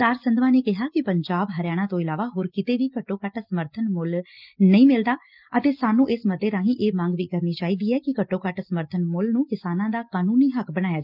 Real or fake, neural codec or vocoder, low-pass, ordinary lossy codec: real; none; 5.4 kHz; Opus, 24 kbps